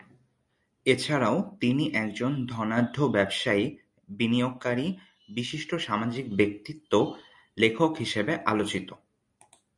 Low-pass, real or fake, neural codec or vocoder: 10.8 kHz; real; none